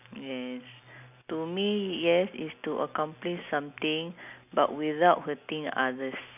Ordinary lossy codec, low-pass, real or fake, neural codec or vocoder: none; 3.6 kHz; real; none